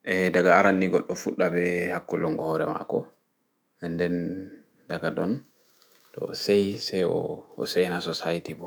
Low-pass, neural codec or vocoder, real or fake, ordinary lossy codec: none; autoencoder, 48 kHz, 128 numbers a frame, DAC-VAE, trained on Japanese speech; fake; none